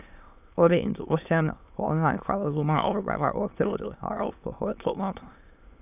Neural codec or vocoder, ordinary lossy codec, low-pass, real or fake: autoencoder, 22.05 kHz, a latent of 192 numbers a frame, VITS, trained on many speakers; none; 3.6 kHz; fake